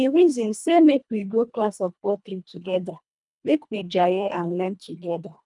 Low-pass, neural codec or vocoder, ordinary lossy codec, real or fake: 10.8 kHz; codec, 24 kHz, 1.5 kbps, HILCodec; none; fake